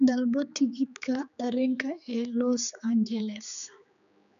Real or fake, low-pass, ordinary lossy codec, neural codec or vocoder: fake; 7.2 kHz; none; codec, 16 kHz, 4 kbps, X-Codec, HuBERT features, trained on general audio